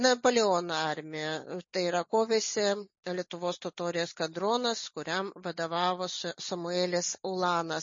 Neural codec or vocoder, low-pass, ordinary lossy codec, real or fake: none; 7.2 kHz; MP3, 32 kbps; real